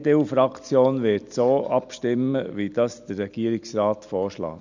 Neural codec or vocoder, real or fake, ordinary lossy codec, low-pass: none; real; none; 7.2 kHz